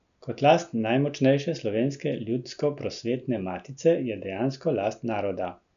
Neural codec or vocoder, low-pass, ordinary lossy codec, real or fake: none; 7.2 kHz; none; real